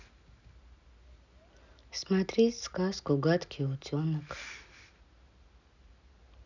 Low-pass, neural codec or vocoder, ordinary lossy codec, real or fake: 7.2 kHz; none; none; real